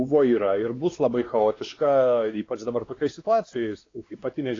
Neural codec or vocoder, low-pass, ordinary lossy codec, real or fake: codec, 16 kHz, 2 kbps, X-Codec, WavLM features, trained on Multilingual LibriSpeech; 7.2 kHz; AAC, 32 kbps; fake